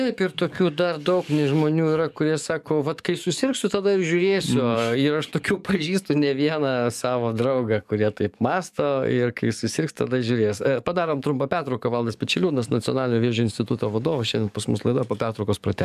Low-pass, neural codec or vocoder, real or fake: 14.4 kHz; codec, 44.1 kHz, 7.8 kbps, DAC; fake